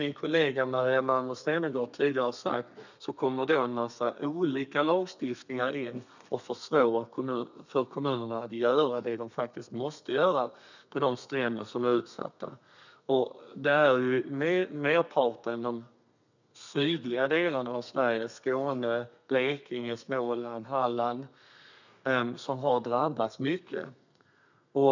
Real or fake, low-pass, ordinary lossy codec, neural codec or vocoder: fake; 7.2 kHz; none; codec, 32 kHz, 1.9 kbps, SNAC